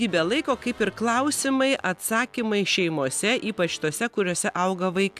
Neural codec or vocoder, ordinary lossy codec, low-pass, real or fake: none; AAC, 96 kbps; 14.4 kHz; real